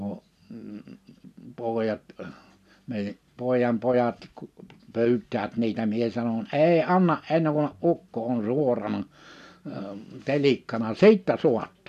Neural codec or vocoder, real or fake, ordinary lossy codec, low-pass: none; real; none; 14.4 kHz